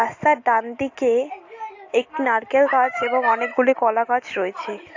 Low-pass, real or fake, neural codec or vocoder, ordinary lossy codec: 7.2 kHz; real; none; AAC, 48 kbps